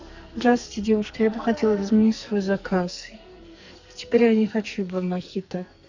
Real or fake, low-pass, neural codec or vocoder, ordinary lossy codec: fake; 7.2 kHz; codec, 44.1 kHz, 2.6 kbps, SNAC; Opus, 64 kbps